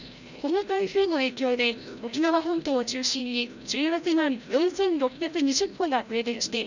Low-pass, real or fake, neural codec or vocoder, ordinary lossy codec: 7.2 kHz; fake; codec, 16 kHz, 0.5 kbps, FreqCodec, larger model; none